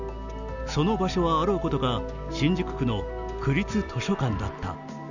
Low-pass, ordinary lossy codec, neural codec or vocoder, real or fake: 7.2 kHz; none; none; real